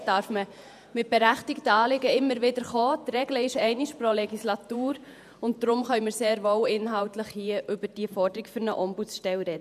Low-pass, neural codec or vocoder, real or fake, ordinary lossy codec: 14.4 kHz; vocoder, 48 kHz, 128 mel bands, Vocos; fake; none